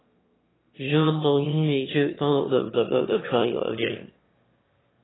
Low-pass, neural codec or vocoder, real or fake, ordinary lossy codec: 7.2 kHz; autoencoder, 22.05 kHz, a latent of 192 numbers a frame, VITS, trained on one speaker; fake; AAC, 16 kbps